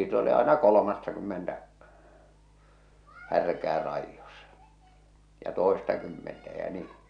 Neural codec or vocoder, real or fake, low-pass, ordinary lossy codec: none; real; 9.9 kHz; none